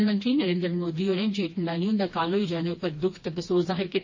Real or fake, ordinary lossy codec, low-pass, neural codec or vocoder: fake; MP3, 32 kbps; 7.2 kHz; codec, 16 kHz, 2 kbps, FreqCodec, smaller model